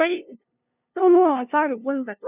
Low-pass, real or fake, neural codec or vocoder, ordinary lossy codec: 3.6 kHz; fake; codec, 16 kHz, 0.5 kbps, FunCodec, trained on LibriTTS, 25 frames a second; none